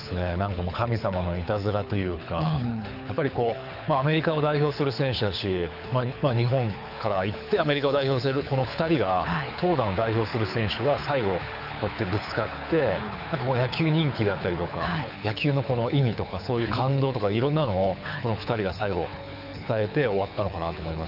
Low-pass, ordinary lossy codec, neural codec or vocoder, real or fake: 5.4 kHz; none; codec, 24 kHz, 6 kbps, HILCodec; fake